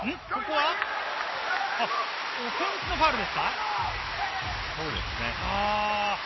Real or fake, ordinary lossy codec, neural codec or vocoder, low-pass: real; MP3, 24 kbps; none; 7.2 kHz